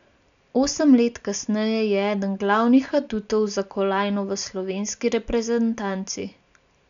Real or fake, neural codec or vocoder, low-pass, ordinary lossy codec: real; none; 7.2 kHz; none